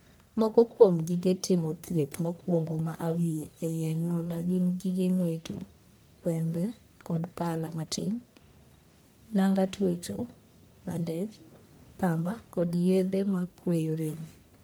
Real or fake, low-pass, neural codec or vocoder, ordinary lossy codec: fake; none; codec, 44.1 kHz, 1.7 kbps, Pupu-Codec; none